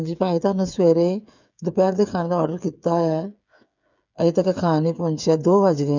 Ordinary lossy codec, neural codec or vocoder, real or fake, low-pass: none; codec, 16 kHz, 8 kbps, FreqCodec, smaller model; fake; 7.2 kHz